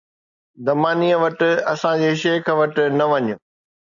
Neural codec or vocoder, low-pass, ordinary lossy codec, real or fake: none; 7.2 kHz; MP3, 96 kbps; real